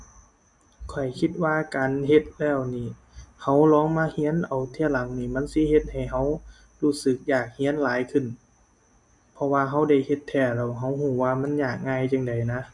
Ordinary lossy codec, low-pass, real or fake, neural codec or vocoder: none; 10.8 kHz; real; none